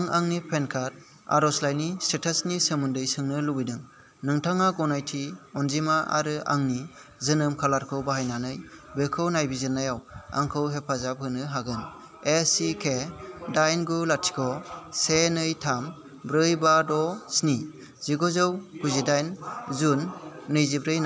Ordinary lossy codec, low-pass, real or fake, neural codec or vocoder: none; none; real; none